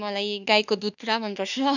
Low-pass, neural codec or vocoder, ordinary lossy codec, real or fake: 7.2 kHz; autoencoder, 48 kHz, 32 numbers a frame, DAC-VAE, trained on Japanese speech; none; fake